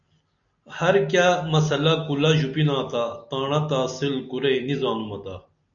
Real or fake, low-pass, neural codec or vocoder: real; 7.2 kHz; none